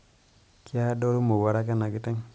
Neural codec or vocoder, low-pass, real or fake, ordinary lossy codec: none; none; real; none